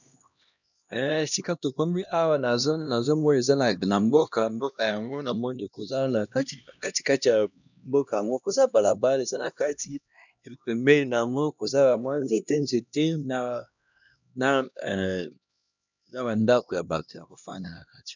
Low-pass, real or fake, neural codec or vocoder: 7.2 kHz; fake; codec, 16 kHz, 1 kbps, X-Codec, HuBERT features, trained on LibriSpeech